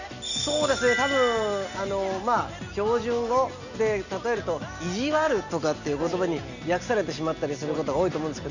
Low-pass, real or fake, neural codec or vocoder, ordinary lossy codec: 7.2 kHz; real; none; none